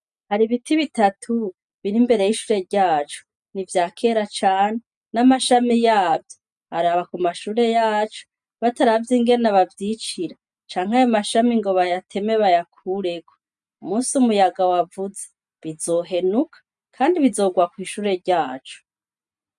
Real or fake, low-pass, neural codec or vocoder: real; 10.8 kHz; none